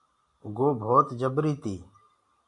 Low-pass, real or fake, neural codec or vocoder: 10.8 kHz; real; none